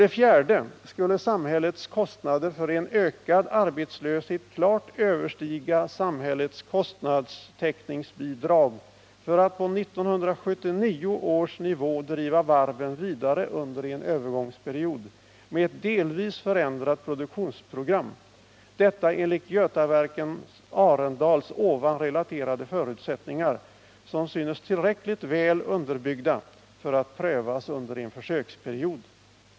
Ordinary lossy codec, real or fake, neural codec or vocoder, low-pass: none; real; none; none